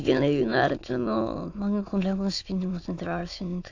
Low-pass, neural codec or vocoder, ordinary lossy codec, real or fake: 7.2 kHz; autoencoder, 22.05 kHz, a latent of 192 numbers a frame, VITS, trained on many speakers; AAC, 48 kbps; fake